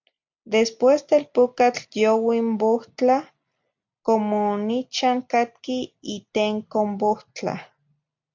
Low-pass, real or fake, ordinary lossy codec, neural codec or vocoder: 7.2 kHz; real; MP3, 64 kbps; none